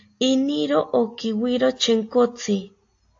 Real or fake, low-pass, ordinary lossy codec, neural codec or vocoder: real; 7.2 kHz; AAC, 48 kbps; none